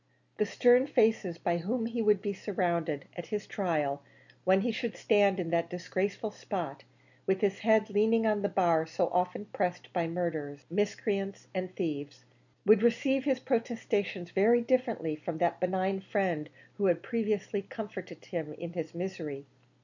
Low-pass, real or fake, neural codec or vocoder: 7.2 kHz; real; none